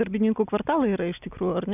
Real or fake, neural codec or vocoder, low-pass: real; none; 3.6 kHz